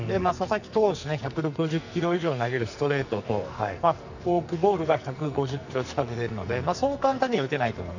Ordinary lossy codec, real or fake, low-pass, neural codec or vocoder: none; fake; 7.2 kHz; codec, 44.1 kHz, 2.6 kbps, SNAC